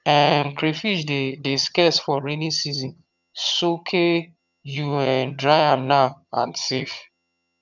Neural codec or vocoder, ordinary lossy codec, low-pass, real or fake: vocoder, 22.05 kHz, 80 mel bands, HiFi-GAN; none; 7.2 kHz; fake